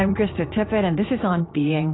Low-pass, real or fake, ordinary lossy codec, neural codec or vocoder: 7.2 kHz; fake; AAC, 16 kbps; vocoder, 44.1 kHz, 80 mel bands, Vocos